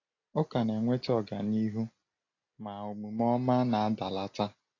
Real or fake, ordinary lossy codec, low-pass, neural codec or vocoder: real; AAC, 32 kbps; 7.2 kHz; none